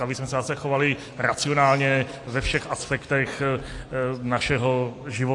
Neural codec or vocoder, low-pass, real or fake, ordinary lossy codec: none; 10.8 kHz; real; AAC, 48 kbps